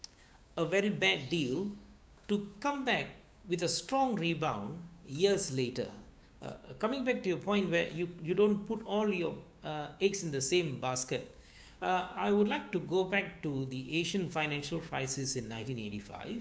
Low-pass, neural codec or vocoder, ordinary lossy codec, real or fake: none; codec, 16 kHz, 6 kbps, DAC; none; fake